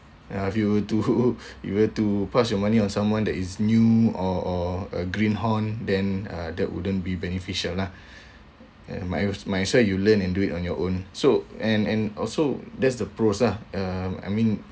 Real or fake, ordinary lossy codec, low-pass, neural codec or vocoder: real; none; none; none